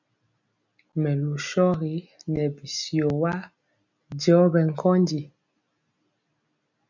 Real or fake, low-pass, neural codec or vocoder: real; 7.2 kHz; none